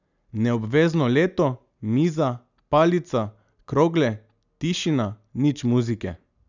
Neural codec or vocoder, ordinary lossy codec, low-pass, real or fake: none; none; 7.2 kHz; real